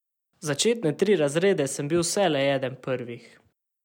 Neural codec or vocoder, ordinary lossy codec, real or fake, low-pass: none; none; real; 19.8 kHz